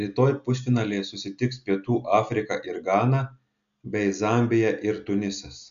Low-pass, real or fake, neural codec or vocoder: 7.2 kHz; real; none